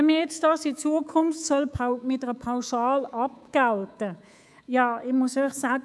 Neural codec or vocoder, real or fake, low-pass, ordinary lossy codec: codec, 24 kHz, 3.1 kbps, DualCodec; fake; none; none